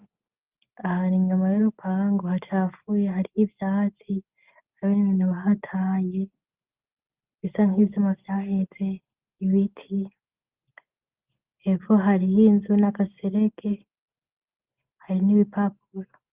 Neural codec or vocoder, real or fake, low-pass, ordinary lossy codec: none; real; 3.6 kHz; Opus, 24 kbps